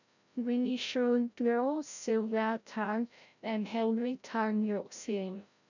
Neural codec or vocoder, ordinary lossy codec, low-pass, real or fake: codec, 16 kHz, 0.5 kbps, FreqCodec, larger model; none; 7.2 kHz; fake